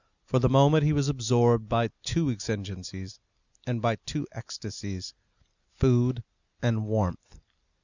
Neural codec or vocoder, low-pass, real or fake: none; 7.2 kHz; real